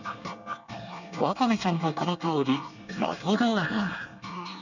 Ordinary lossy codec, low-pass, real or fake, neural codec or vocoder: none; 7.2 kHz; fake; codec, 24 kHz, 1 kbps, SNAC